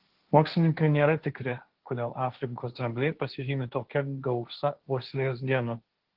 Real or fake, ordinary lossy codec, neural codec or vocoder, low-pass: fake; Opus, 16 kbps; codec, 16 kHz, 1.1 kbps, Voila-Tokenizer; 5.4 kHz